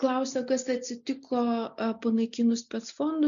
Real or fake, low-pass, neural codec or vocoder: real; 7.2 kHz; none